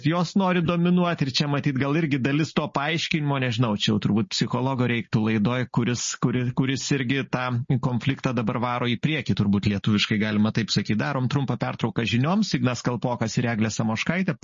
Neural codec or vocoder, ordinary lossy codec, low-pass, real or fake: none; MP3, 32 kbps; 7.2 kHz; real